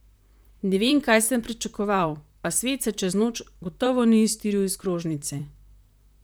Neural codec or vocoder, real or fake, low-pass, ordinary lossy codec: vocoder, 44.1 kHz, 128 mel bands, Pupu-Vocoder; fake; none; none